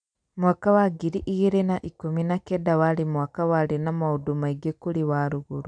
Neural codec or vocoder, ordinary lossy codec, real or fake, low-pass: none; none; real; 9.9 kHz